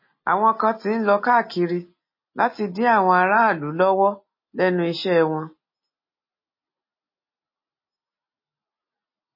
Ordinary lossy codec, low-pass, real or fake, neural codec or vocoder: MP3, 24 kbps; 5.4 kHz; real; none